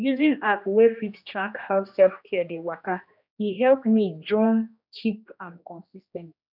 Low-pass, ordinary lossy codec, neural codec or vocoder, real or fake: 5.4 kHz; none; codec, 16 kHz, 1 kbps, X-Codec, HuBERT features, trained on general audio; fake